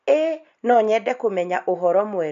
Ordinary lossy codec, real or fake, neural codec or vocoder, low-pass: AAC, 96 kbps; real; none; 7.2 kHz